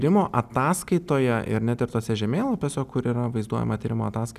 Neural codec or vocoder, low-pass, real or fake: none; 14.4 kHz; real